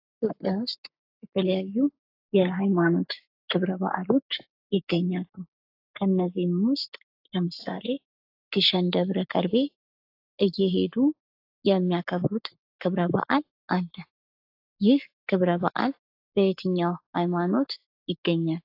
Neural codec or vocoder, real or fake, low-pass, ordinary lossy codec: codec, 44.1 kHz, 7.8 kbps, Pupu-Codec; fake; 5.4 kHz; AAC, 32 kbps